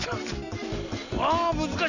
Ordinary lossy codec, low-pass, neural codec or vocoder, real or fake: none; 7.2 kHz; none; real